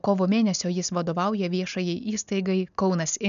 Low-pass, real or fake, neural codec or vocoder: 7.2 kHz; real; none